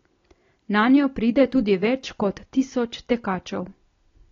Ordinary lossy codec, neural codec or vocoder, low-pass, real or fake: AAC, 32 kbps; none; 7.2 kHz; real